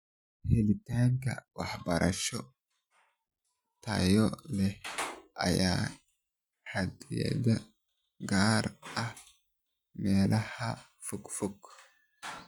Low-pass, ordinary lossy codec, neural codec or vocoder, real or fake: 19.8 kHz; none; none; real